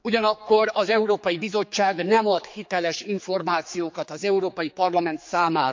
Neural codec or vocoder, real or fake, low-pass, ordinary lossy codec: codec, 16 kHz, 4 kbps, X-Codec, HuBERT features, trained on general audio; fake; 7.2 kHz; MP3, 64 kbps